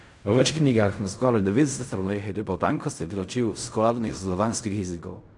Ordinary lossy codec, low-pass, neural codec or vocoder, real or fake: none; 10.8 kHz; codec, 16 kHz in and 24 kHz out, 0.4 kbps, LongCat-Audio-Codec, fine tuned four codebook decoder; fake